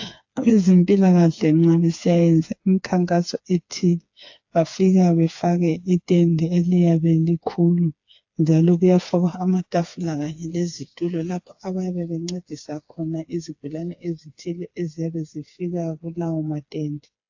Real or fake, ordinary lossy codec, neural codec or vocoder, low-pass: fake; AAC, 48 kbps; codec, 16 kHz, 4 kbps, FreqCodec, smaller model; 7.2 kHz